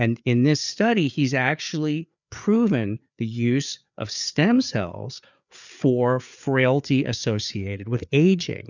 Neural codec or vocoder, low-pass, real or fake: codec, 16 kHz, 4 kbps, FreqCodec, larger model; 7.2 kHz; fake